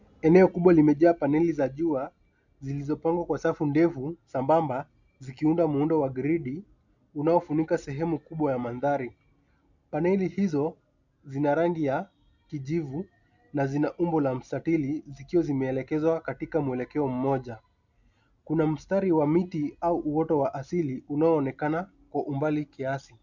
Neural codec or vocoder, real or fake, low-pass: none; real; 7.2 kHz